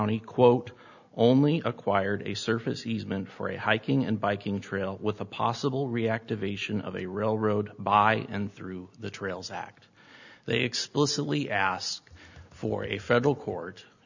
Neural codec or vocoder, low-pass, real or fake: none; 7.2 kHz; real